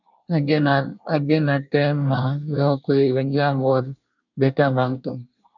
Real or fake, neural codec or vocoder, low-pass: fake; codec, 24 kHz, 1 kbps, SNAC; 7.2 kHz